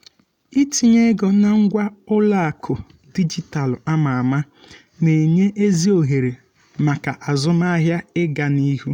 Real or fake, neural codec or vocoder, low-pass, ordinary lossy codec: real; none; 19.8 kHz; none